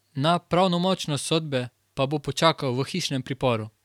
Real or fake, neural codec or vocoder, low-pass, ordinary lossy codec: fake; vocoder, 44.1 kHz, 128 mel bands every 512 samples, BigVGAN v2; 19.8 kHz; none